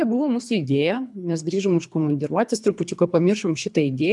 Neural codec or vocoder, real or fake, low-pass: codec, 24 kHz, 3 kbps, HILCodec; fake; 10.8 kHz